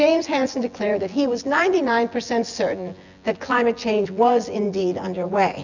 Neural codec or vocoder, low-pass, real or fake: vocoder, 24 kHz, 100 mel bands, Vocos; 7.2 kHz; fake